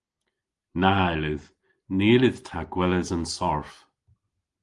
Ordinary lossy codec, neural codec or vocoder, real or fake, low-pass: Opus, 24 kbps; none; real; 10.8 kHz